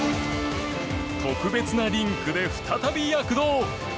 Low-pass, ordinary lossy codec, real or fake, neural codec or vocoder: none; none; real; none